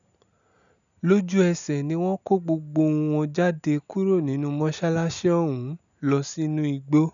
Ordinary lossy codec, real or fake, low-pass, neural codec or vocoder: none; real; 7.2 kHz; none